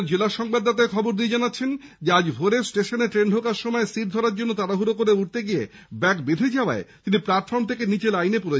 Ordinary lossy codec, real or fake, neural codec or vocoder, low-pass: none; real; none; none